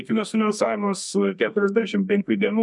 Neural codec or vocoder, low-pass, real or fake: codec, 24 kHz, 0.9 kbps, WavTokenizer, medium music audio release; 10.8 kHz; fake